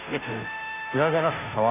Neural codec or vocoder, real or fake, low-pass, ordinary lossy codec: codec, 16 kHz, 0.5 kbps, FunCodec, trained on Chinese and English, 25 frames a second; fake; 3.6 kHz; none